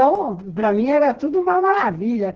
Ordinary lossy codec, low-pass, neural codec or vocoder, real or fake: Opus, 16 kbps; 7.2 kHz; codec, 16 kHz, 2 kbps, FreqCodec, smaller model; fake